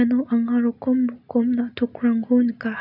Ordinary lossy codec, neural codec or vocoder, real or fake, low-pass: none; none; real; 5.4 kHz